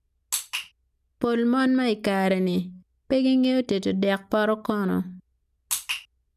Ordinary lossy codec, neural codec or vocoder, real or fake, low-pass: none; none; real; 14.4 kHz